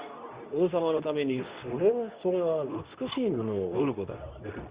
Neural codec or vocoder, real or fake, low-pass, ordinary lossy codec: codec, 24 kHz, 0.9 kbps, WavTokenizer, medium speech release version 2; fake; 3.6 kHz; Opus, 64 kbps